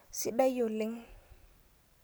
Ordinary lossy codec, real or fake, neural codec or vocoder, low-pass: none; real; none; none